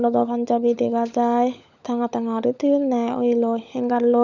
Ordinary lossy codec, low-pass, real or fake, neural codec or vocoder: none; 7.2 kHz; fake; codec, 16 kHz, 16 kbps, FunCodec, trained on LibriTTS, 50 frames a second